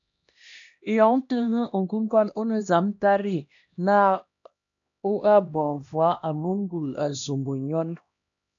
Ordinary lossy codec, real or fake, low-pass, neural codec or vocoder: AAC, 64 kbps; fake; 7.2 kHz; codec, 16 kHz, 1 kbps, X-Codec, HuBERT features, trained on LibriSpeech